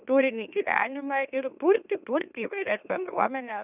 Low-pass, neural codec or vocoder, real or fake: 3.6 kHz; autoencoder, 44.1 kHz, a latent of 192 numbers a frame, MeloTTS; fake